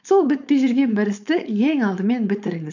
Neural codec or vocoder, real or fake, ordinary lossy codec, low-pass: codec, 16 kHz, 4.8 kbps, FACodec; fake; none; 7.2 kHz